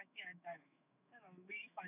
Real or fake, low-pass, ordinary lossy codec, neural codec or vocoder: fake; 3.6 kHz; none; autoencoder, 48 kHz, 128 numbers a frame, DAC-VAE, trained on Japanese speech